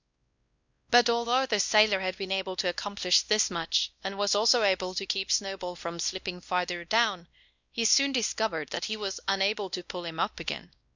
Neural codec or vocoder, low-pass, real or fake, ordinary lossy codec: codec, 16 kHz, 1 kbps, X-Codec, WavLM features, trained on Multilingual LibriSpeech; 7.2 kHz; fake; Opus, 64 kbps